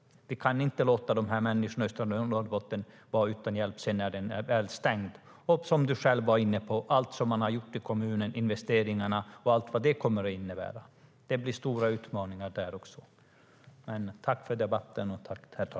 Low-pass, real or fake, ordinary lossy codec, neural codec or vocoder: none; real; none; none